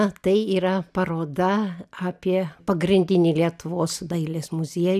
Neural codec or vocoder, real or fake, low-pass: none; real; 14.4 kHz